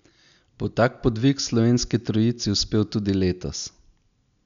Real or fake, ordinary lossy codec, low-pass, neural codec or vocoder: real; none; 7.2 kHz; none